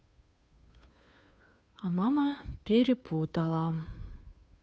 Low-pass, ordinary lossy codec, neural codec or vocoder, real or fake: none; none; codec, 16 kHz, 2 kbps, FunCodec, trained on Chinese and English, 25 frames a second; fake